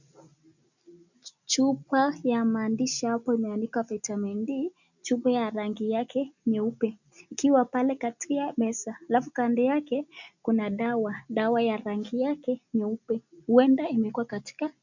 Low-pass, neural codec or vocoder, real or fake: 7.2 kHz; none; real